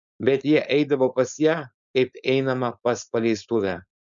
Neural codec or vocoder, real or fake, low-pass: codec, 16 kHz, 4.8 kbps, FACodec; fake; 7.2 kHz